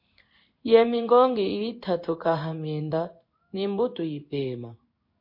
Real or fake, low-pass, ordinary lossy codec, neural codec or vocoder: fake; 5.4 kHz; MP3, 32 kbps; codec, 16 kHz in and 24 kHz out, 1 kbps, XY-Tokenizer